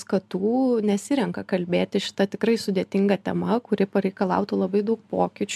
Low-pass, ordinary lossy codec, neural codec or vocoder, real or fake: 14.4 kHz; MP3, 96 kbps; none; real